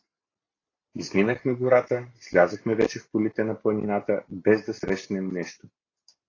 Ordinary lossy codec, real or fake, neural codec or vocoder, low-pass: AAC, 32 kbps; real; none; 7.2 kHz